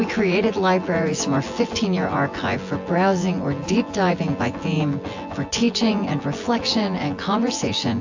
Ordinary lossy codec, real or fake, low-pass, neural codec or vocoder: AAC, 48 kbps; fake; 7.2 kHz; vocoder, 24 kHz, 100 mel bands, Vocos